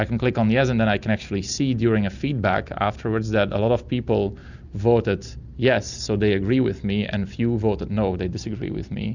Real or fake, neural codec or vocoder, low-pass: real; none; 7.2 kHz